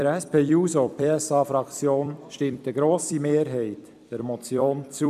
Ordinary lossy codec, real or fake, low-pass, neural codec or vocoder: none; fake; 14.4 kHz; vocoder, 44.1 kHz, 128 mel bands every 256 samples, BigVGAN v2